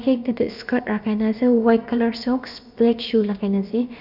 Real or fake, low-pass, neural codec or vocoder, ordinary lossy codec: fake; 5.4 kHz; codec, 16 kHz, about 1 kbps, DyCAST, with the encoder's durations; none